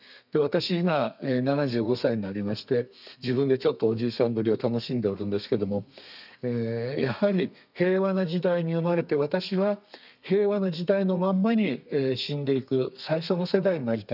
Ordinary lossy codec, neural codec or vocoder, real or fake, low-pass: none; codec, 32 kHz, 1.9 kbps, SNAC; fake; 5.4 kHz